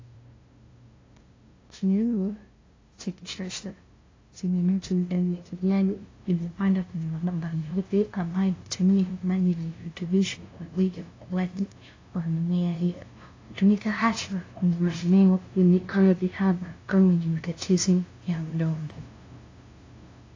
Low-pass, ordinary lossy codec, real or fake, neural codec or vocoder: 7.2 kHz; AAC, 32 kbps; fake; codec, 16 kHz, 0.5 kbps, FunCodec, trained on LibriTTS, 25 frames a second